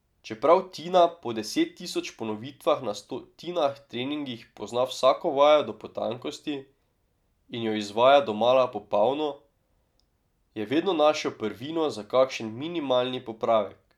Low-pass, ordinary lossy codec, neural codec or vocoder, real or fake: 19.8 kHz; none; none; real